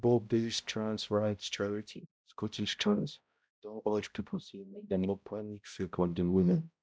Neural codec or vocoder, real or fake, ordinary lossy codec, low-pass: codec, 16 kHz, 0.5 kbps, X-Codec, HuBERT features, trained on balanced general audio; fake; none; none